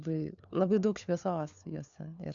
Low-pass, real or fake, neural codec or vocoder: 7.2 kHz; fake; codec, 16 kHz, 4 kbps, FunCodec, trained on LibriTTS, 50 frames a second